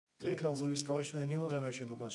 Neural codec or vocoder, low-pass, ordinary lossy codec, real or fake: codec, 24 kHz, 0.9 kbps, WavTokenizer, medium music audio release; 10.8 kHz; MP3, 96 kbps; fake